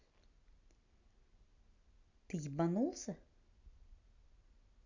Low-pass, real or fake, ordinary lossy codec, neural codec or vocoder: 7.2 kHz; real; none; none